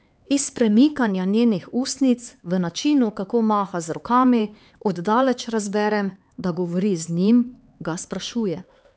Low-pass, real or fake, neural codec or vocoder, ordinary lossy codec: none; fake; codec, 16 kHz, 4 kbps, X-Codec, HuBERT features, trained on LibriSpeech; none